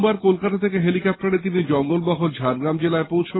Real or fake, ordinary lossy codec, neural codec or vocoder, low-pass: real; AAC, 16 kbps; none; 7.2 kHz